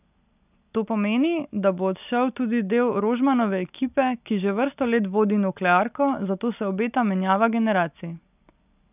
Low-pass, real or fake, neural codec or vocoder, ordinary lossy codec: 3.6 kHz; real; none; none